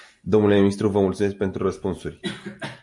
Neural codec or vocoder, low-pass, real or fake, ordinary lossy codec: none; 10.8 kHz; real; MP3, 96 kbps